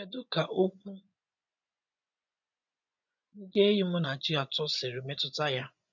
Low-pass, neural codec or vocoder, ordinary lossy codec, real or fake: 7.2 kHz; vocoder, 24 kHz, 100 mel bands, Vocos; none; fake